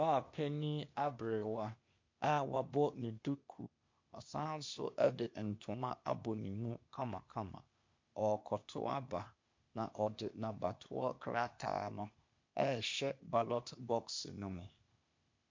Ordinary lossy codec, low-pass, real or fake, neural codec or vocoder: MP3, 48 kbps; 7.2 kHz; fake; codec, 16 kHz, 0.8 kbps, ZipCodec